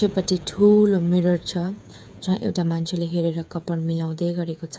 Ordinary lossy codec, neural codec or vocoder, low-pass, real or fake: none; codec, 16 kHz, 8 kbps, FreqCodec, smaller model; none; fake